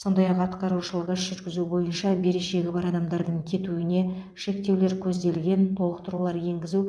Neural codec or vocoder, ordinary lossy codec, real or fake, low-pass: vocoder, 22.05 kHz, 80 mel bands, WaveNeXt; none; fake; none